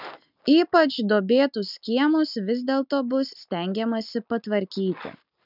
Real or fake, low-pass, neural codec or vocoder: real; 5.4 kHz; none